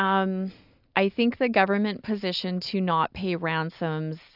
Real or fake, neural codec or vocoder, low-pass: real; none; 5.4 kHz